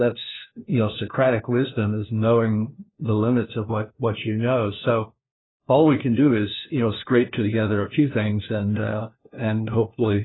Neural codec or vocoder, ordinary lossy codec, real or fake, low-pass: codec, 16 kHz, 1 kbps, FunCodec, trained on LibriTTS, 50 frames a second; AAC, 16 kbps; fake; 7.2 kHz